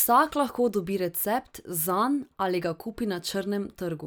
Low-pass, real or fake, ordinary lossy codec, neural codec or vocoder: none; real; none; none